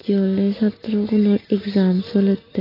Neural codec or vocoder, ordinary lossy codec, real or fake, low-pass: none; AAC, 24 kbps; real; 5.4 kHz